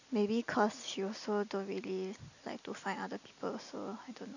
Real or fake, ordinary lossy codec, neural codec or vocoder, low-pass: real; none; none; 7.2 kHz